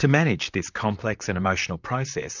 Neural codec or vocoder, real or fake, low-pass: vocoder, 44.1 kHz, 128 mel bands, Pupu-Vocoder; fake; 7.2 kHz